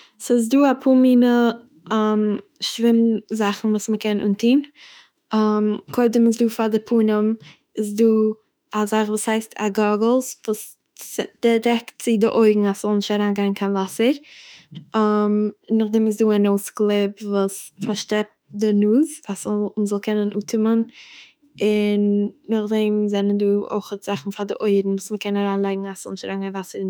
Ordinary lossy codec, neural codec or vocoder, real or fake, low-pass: none; autoencoder, 48 kHz, 32 numbers a frame, DAC-VAE, trained on Japanese speech; fake; none